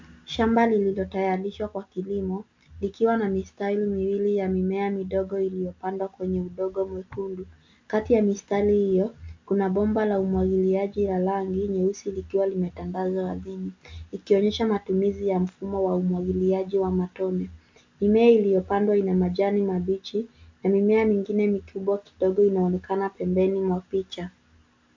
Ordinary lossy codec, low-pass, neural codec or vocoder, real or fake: MP3, 64 kbps; 7.2 kHz; none; real